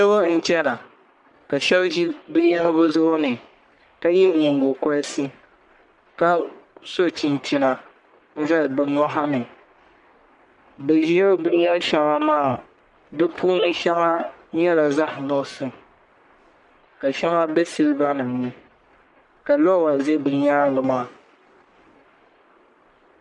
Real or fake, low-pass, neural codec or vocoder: fake; 10.8 kHz; codec, 44.1 kHz, 1.7 kbps, Pupu-Codec